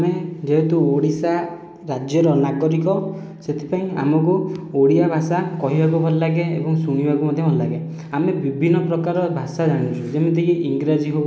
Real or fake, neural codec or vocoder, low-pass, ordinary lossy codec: real; none; none; none